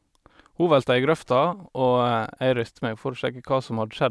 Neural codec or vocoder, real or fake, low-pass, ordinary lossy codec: none; real; none; none